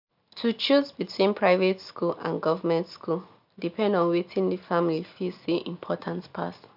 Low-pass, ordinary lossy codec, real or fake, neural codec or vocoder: 5.4 kHz; MP3, 48 kbps; real; none